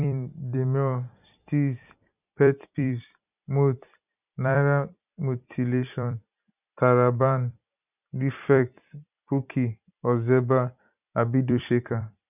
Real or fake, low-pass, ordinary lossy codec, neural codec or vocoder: fake; 3.6 kHz; none; vocoder, 44.1 kHz, 128 mel bands every 256 samples, BigVGAN v2